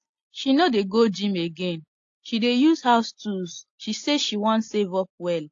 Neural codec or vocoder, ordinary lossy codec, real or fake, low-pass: none; AAC, 48 kbps; real; 7.2 kHz